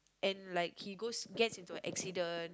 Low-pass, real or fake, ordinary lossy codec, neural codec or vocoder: none; real; none; none